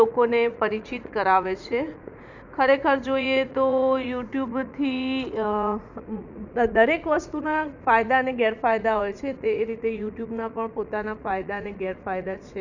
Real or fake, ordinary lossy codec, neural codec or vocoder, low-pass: fake; none; vocoder, 44.1 kHz, 80 mel bands, Vocos; 7.2 kHz